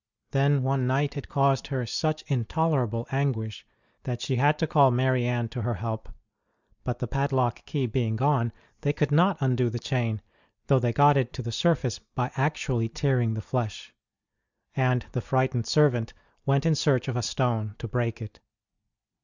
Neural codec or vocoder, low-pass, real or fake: none; 7.2 kHz; real